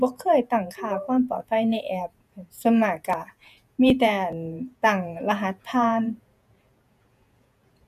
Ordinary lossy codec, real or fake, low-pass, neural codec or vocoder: none; fake; 14.4 kHz; vocoder, 48 kHz, 128 mel bands, Vocos